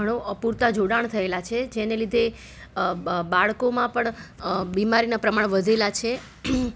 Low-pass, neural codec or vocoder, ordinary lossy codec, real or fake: none; none; none; real